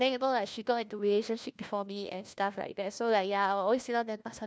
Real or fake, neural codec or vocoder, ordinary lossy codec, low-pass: fake; codec, 16 kHz, 1 kbps, FunCodec, trained on LibriTTS, 50 frames a second; none; none